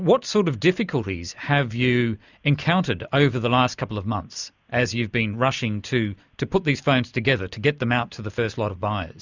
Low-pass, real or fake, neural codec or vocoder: 7.2 kHz; real; none